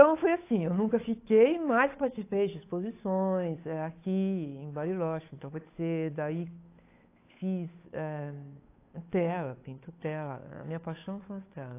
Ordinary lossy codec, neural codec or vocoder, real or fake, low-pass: AAC, 24 kbps; codec, 16 kHz, 8 kbps, FunCodec, trained on Chinese and English, 25 frames a second; fake; 3.6 kHz